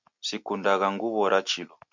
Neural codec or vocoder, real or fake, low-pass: none; real; 7.2 kHz